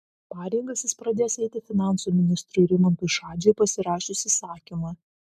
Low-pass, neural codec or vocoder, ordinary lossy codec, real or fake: 14.4 kHz; none; MP3, 96 kbps; real